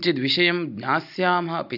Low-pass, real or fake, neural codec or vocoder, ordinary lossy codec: 5.4 kHz; real; none; AAC, 48 kbps